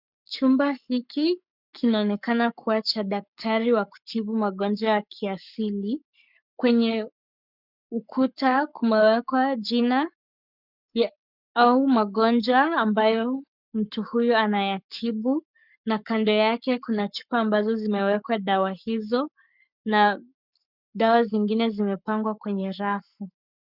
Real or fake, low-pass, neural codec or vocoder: fake; 5.4 kHz; codec, 44.1 kHz, 7.8 kbps, Pupu-Codec